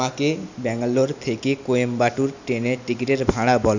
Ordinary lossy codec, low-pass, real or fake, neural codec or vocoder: none; 7.2 kHz; real; none